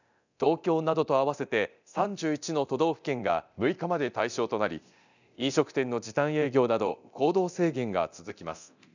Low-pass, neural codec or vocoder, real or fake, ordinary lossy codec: 7.2 kHz; codec, 24 kHz, 0.9 kbps, DualCodec; fake; none